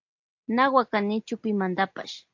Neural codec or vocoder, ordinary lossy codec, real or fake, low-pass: none; AAC, 48 kbps; real; 7.2 kHz